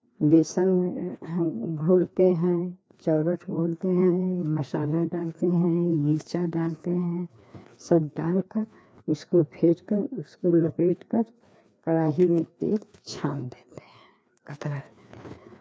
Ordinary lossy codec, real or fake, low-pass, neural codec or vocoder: none; fake; none; codec, 16 kHz, 2 kbps, FreqCodec, larger model